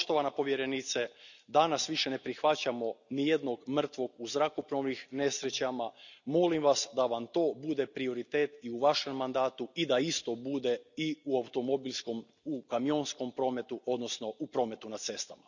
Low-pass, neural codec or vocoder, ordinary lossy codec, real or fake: 7.2 kHz; none; none; real